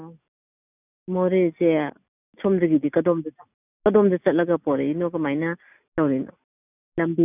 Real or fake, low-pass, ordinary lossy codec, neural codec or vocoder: real; 3.6 kHz; none; none